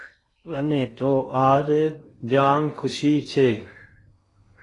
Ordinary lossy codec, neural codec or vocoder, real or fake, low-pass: AAC, 32 kbps; codec, 16 kHz in and 24 kHz out, 0.6 kbps, FocalCodec, streaming, 2048 codes; fake; 10.8 kHz